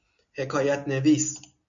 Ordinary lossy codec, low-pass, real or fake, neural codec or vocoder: MP3, 64 kbps; 7.2 kHz; real; none